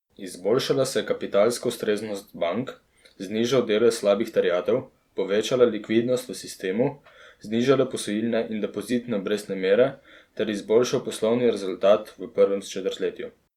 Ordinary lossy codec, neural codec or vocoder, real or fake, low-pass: Opus, 64 kbps; vocoder, 44.1 kHz, 128 mel bands every 256 samples, BigVGAN v2; fake; 19.8 kHz